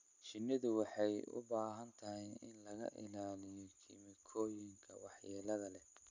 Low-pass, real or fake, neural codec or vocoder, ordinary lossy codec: 7.2 kHz; real; none; none